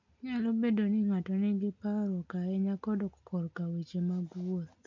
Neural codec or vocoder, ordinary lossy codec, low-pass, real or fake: none; MP3, 48 kbps; 7.2 kHz; real